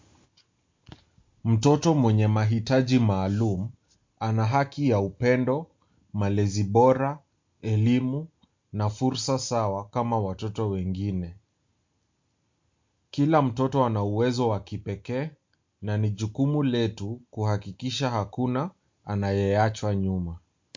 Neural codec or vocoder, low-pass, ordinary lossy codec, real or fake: none; 7.2 kHz; MP3, 48 kbps; real